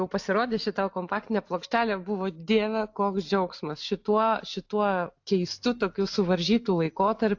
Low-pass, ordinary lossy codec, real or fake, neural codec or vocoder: 7.2 kHz; AAC, 48 kbps; real; none